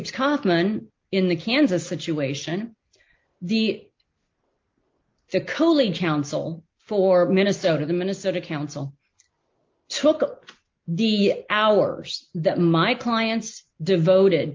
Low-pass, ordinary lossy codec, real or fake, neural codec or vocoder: 7.2 kHz; Opus, 24 kbps; real; none